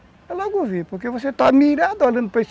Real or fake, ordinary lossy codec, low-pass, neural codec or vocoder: real; none; none; none